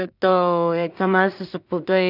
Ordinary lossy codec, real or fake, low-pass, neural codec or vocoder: none; fake; 5.4 kHz; codec, 16 kHz in and 24 kHz out, 0.4 kbps, LongCat-Audio-Codec, two codebook decoder